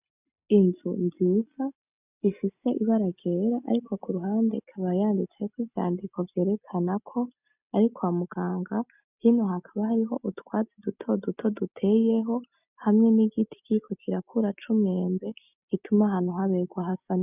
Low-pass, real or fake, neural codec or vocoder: 3.6 kHz; real; none